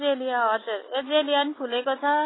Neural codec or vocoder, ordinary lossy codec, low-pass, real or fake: none; AAC, 16 kbps; 7.2 kHz; real